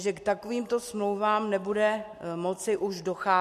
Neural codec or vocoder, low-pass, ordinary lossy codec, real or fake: none; 14.4 kHz; MP3, 64 kbps; real